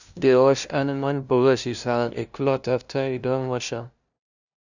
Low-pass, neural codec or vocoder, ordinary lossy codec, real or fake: 7.2 kHz; codec, 16 kHz, 0.5 kbps, FunCodec, trained on LibriTTS, 25 frames a second; none; fake